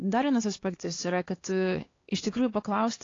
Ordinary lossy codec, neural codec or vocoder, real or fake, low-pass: AAC, 32 kbps; codec, 16 kHz, 2 kbps, X-Codec, HuBERT features, trained on LibriSpeech; fake; 7.2 kHz